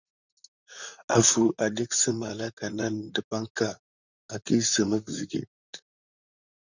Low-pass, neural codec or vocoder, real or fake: 7.2 kHz; vocoder, 44.1 kHz, 128 mel bands, Pupu-Vocoder; fake